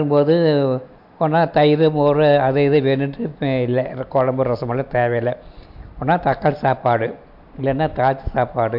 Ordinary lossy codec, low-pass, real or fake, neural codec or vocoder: MP3, 48 kbps; 5.4 kHz; real; none